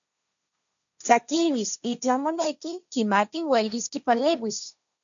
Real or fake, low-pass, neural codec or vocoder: fake; 7.2 kHz; codec, 16 kHz, 1.1 kbps, Voila-Tokenizer